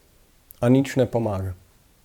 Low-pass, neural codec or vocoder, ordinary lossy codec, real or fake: 19.8 kHz; vocoder, 44.1 kHz, 128 mel bands every 512 samples, BigVGAN v2; none; fake